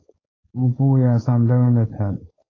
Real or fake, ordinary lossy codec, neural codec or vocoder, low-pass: fake; AAC, 32 kbps; codec, 16 kHz, 4.8 kbps, FACodec; 7.2 kHz